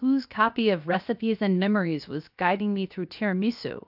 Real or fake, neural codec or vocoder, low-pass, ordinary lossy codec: fake; codec, 16 kHz, 0.7 kbps, FocalCodec; 5.4 kHz; AAC, 48 kbps